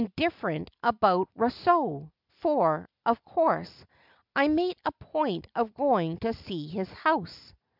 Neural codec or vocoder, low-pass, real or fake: none; 5.4 kHz; real